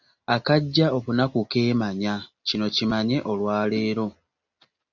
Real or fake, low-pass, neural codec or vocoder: real; 7.2 kHz; none